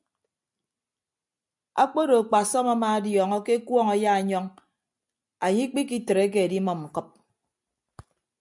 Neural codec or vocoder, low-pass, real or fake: none; 10.8 kHz; real